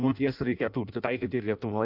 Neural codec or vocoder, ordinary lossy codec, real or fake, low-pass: codec, 16 kHz in and 24 kHz out, 0.6 kbps, FireRedTTS-2 codec; AAC, 48 kbps; fake; 5.4 kHz